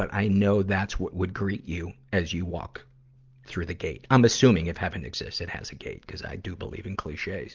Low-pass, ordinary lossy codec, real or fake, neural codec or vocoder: 7.2 kHz; Opus, 32 kbps; real; none